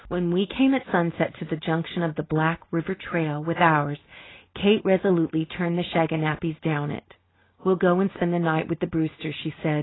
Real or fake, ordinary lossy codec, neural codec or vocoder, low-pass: real; AAC, 16 kbps; none; 7.2 kHz